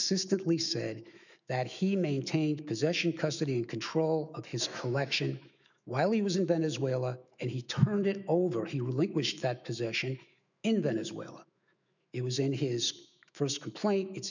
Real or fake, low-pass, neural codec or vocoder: fake; 7.2 kHz; autoencoder, 48 kHz, 128 numbers a frame, DAC-VAE, trained on Japanese speech